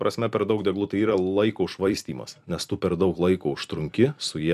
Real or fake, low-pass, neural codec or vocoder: fake; 14.4 kHz; vocoder, 44.1 kHz, 128 mel bands every 256 samples, BigVGAN v2